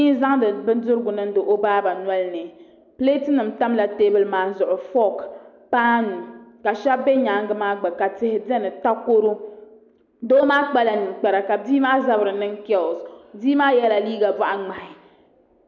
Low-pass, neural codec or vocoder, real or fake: 7.2 kHz; none; real